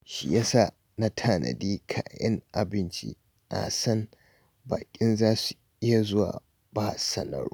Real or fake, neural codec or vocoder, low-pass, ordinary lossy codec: real; none; none; none